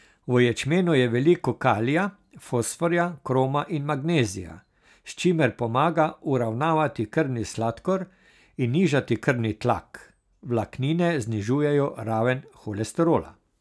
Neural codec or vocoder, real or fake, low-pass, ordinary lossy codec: none; real; none; none